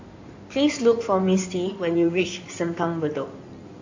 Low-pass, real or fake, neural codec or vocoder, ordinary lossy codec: 7.2 kHz; fake; codec, 16 kHz in and 24 kHz out, 2.2 kbps, FireRedTTS-2 codec; AAC, 48 kbps